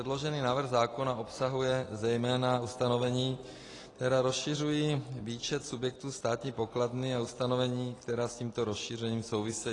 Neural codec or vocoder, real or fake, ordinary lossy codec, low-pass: none; real; AAC, 32 kbps; 10.8 kHz